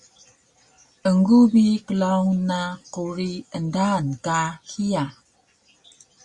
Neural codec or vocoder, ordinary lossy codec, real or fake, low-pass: none; Opus, 64 kbps; real; 10.8 kHz